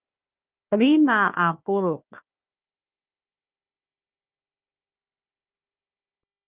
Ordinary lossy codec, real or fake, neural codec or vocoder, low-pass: Opus, 32 kbps; fake; codec, 16 kHz, 1 kbps, FunCodec, trained on Chinese and English, 50 frames a second; 3.6 kHz